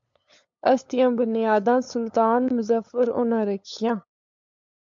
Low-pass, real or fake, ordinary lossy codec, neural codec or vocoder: 7.2 kHz; fake; AAC, 64 kbps; codec, 16 kHz, 8 kbps, FunCodec, trained on LibriTTS, 25 frames a second